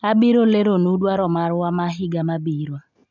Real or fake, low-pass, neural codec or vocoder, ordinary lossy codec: real; 7.2 kHz; none; none